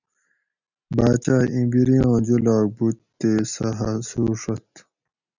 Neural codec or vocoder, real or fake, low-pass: none; real; 7.2 kHz